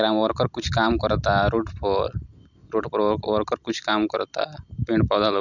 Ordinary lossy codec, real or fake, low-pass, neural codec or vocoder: none; real; 7.2 kHz; none